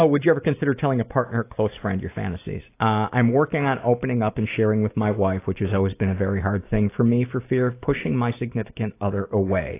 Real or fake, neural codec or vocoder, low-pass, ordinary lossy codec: real; none; 3.6 kHz; AAC, 24 kbps